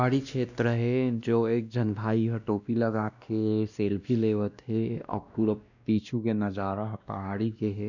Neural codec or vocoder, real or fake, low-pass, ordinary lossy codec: codec, 16 kHz, 1 kbps, X-Codec, WavLM features, trained on Multilingual LibriSpeech; fake; 7.2 kHz; none